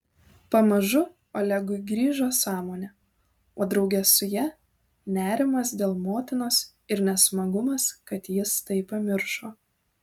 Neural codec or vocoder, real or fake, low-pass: none; real; 19.8 kHz